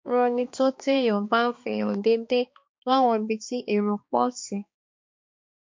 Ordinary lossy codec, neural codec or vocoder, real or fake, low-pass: MP3, 48 kbps; codec, 16 kHz, 2 kbps, X-Codec, HuBERT features, trained on balanced general audio; fake; 7.2 kHz